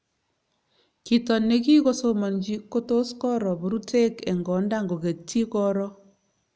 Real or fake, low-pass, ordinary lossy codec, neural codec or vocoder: real; none; none; none